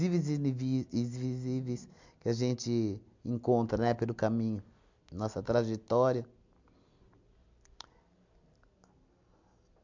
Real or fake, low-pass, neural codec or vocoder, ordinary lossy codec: real; 7.2 kHz; none; MP3, 64 kbps